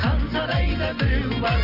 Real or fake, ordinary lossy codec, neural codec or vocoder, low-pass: real; none; none; 5.4 kHz